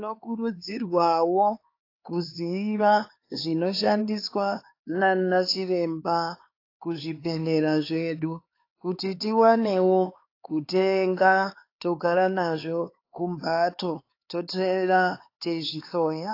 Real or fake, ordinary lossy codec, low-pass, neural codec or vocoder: fake; AAC, 32 kbps; 5.4 kHz; codec, 16 kHz, 4 kbps, X-Codec, HuBERT features, trained on LibriSpeech